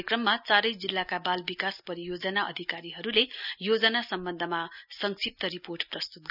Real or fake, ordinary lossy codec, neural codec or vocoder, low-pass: real; none; none; 5.4 kHz